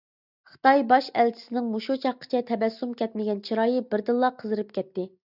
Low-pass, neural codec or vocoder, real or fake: 5.4 kHz; none; real